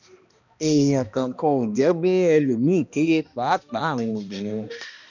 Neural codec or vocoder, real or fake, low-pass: codec, 16 kHz, 1 kbps, X-Codec, HuBERT features, trained on balanced general audio; fake; 7.2 kHz